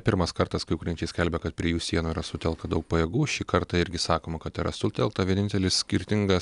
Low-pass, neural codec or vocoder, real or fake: 10.8 kHz; none; real